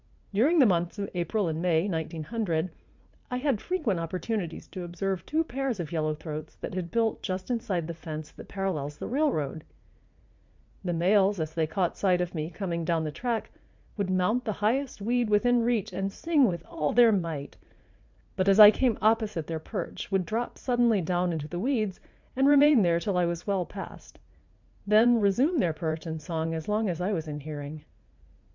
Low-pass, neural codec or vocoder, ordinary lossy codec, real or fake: 7.2 kHz; vocoder, 44.1 kHz, 80 mel bands, Vocos; MP3, 64 kbps; fake